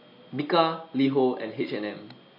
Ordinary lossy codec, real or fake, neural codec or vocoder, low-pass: MP3, 32 kbps; real; none; 5.4 kHz